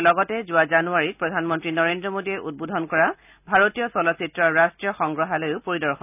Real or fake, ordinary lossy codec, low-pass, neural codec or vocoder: real; none; 3.6 kHz; none